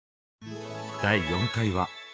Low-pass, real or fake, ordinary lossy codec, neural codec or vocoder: none; fake; none; codec, 16 kHz, 6 kbps, DAC